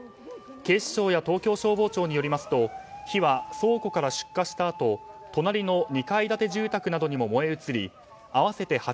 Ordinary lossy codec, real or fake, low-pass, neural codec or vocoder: none; real; none; none